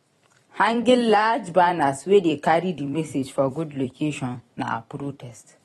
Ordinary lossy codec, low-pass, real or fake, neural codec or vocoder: AAC, 32 kbps; 19.8 kHz; fake; vocoder, 44.1 kHz, 128 mel bands every 256 samples, BigVGAN v2